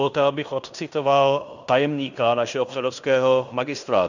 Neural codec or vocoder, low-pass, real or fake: codec, 16 kHz in and 24 kHz out, 0.9 kbps, LongCat-Audio-Codec, fine tuned four codebook decoder; 7.2 kHz; fake